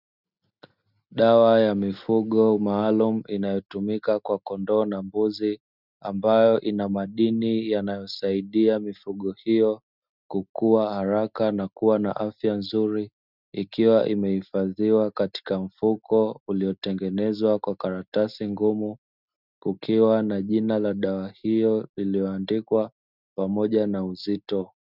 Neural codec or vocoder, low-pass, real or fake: none; 5.4 kHz; real